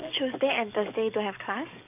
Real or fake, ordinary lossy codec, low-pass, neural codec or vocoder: fake; none; 3.6 kHz; codec, 44.1 kHz, 7.8 kbps, DAC